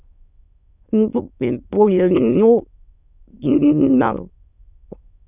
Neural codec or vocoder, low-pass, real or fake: autoencoder, 22.05 kHz, a latent of 192 numbers a frame, VITS, trained on many speakers; 3.6 kHz; fake